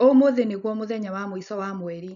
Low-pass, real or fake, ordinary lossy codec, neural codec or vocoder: 7.2 kHz; real; none; none